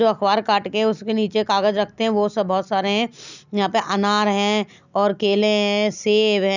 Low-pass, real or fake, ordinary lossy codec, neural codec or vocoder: 7.2 kHz; real; none; none